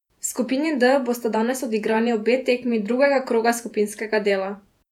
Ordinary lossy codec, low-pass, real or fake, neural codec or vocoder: none; 19.8 kHz; fake; vocoder, 48 kHz, 128 mel bands, Vocos